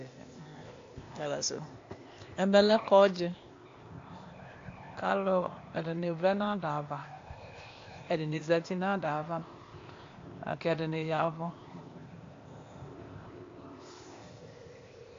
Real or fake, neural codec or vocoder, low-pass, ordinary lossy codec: fake; codec, 16 kHz, 0.8 kbps, ZipCodec; 7.2 kHz; AAC, 48 kbps